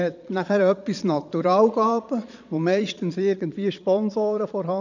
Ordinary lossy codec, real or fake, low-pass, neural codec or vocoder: none; fake; 7.2 kHz; vocoder, 22.05 kHz, 80 mel bands, Vocos